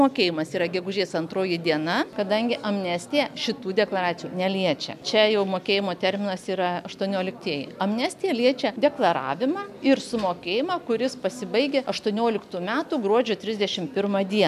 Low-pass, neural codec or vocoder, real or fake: 14.4 kHz; none; real